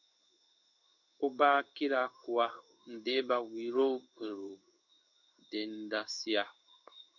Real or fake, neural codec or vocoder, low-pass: fake; codec, 16 kHz in and 24 kHz out, 1 kbps, XY-Tokenizer; 7.2 kHz